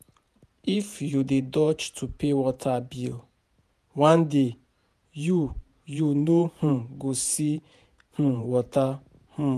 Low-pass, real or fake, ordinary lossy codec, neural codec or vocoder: 14.4 kHz; fake; none; vocoder, 48 kHz, 128 mel bands, Vocos